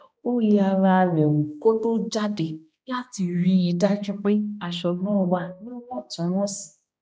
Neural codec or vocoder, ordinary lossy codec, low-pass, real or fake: codec, 16 kHz, 1 kbps, X-Codec, HuBERT features, trained on balanced general audio; none; none; fake